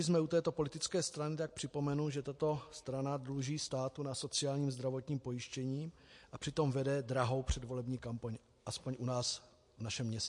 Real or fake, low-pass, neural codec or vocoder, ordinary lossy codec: real; 10.8 kHz; none; MP3, 48 kbps